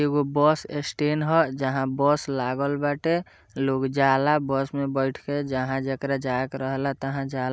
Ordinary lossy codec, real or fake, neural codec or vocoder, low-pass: none; real; none; none